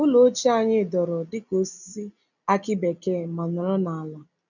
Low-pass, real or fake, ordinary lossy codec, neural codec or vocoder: 7.2 kHz; real; none; none